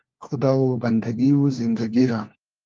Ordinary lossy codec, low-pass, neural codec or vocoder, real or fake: Opus, 32 kbps; 7.2 kHz; codec, 16 kHz, 1 kbps, FunCodec, trained on LibriTTS, 50 frames a second; fake